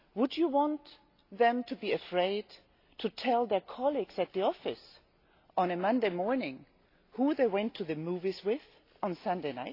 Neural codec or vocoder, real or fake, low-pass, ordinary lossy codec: none; real; 5.4 kHz; AAC, 32 kbps